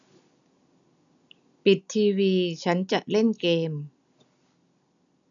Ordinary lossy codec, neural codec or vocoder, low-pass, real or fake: none; none; 7.2 kHz; real